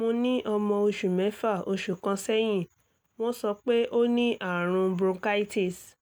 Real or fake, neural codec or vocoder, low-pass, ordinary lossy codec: real; none; none; none